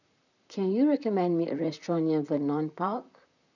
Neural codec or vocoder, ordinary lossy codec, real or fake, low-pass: vocoder, 44.1 kHz, 128 mel bands, Pupu-Vocoder; none; fake; 7.2 kHz